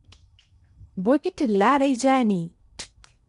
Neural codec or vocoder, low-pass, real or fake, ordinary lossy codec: codec, 16 kHz in and 24 kHz out, 0.8 kbps, FocalCodec, streaming, 65536 codes; 10.8 kHz; fake; none